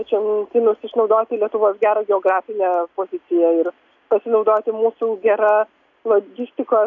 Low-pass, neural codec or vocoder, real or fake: 7.2 kHz; none; real